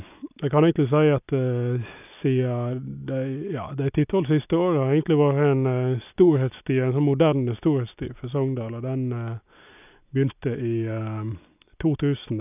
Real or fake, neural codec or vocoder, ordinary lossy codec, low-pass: real; none; none; 3.6 kHz